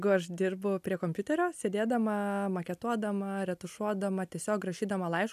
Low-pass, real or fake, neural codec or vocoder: 14.4 kHz; real; none